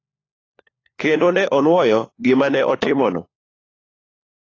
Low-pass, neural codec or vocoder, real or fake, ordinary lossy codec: 7.2 kHz; codec, 16 kHz, 4 kbps, FunCodec, trained on LibriTTS, 50 frames a second; fake; AAC, 32 kbps